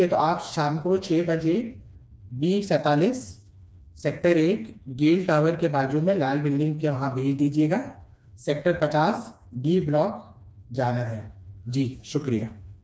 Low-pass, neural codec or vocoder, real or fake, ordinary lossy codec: none; codec, 16 kHz, 2 kbps, FreqCodec, smaller model; fake; none